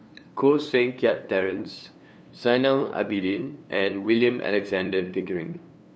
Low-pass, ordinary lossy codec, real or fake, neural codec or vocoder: none; none; fake; codec, 16 kHz, 2 kbps, FunCodec, trained on LibriTTS, 25 frames a second